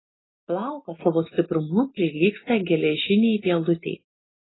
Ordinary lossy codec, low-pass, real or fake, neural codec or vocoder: AAC, 16 kbps; 7.2 kHz; real; none